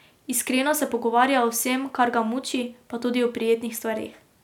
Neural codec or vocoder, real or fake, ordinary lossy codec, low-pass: none; real; none; 19.8 kHz